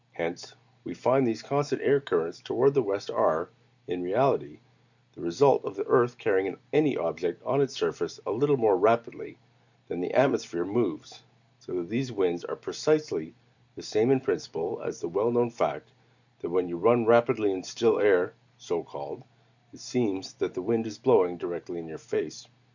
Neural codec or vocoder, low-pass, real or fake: none; 7.2 kHz; real